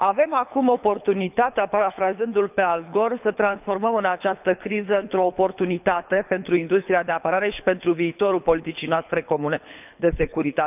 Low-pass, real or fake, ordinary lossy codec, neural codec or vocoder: 3.6 kHz; fake; none; codec, 24 kHz, 6 kbps, HILCodec